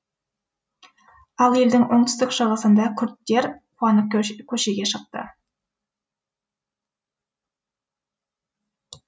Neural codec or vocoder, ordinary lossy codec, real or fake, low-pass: none; none; real; none